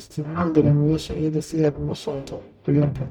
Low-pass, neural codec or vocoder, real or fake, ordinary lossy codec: 19.8 kHz; codec, 44.1 kHz, 0.9 kbps, DAC; fake; none